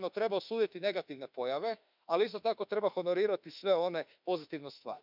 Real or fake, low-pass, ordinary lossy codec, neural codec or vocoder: fake; 5.4 kHz; none; autoencoder, 48 kHz, 32 numbers a frame, DAC-VAE, trained on Japanese speech